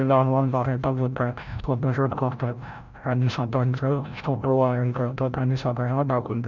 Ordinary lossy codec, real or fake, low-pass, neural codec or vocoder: none; fake; 7.2 kHz; codec, 16 kHz, 0.5 kbps, FreqCodec, larger model